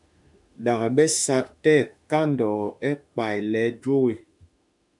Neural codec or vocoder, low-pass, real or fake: autoencoder, 48 kHz, 32 numbers a frame, DAC-VAE, trained on Japanese speech; 10.8 kHz; fake